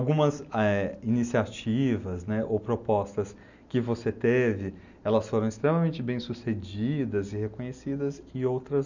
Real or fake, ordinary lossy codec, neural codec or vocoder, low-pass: real; none; none; 7.2 kHz